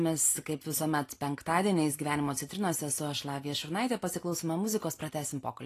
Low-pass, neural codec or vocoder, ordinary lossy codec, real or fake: 14.4 kHz; none; AAC, 48 kbps; real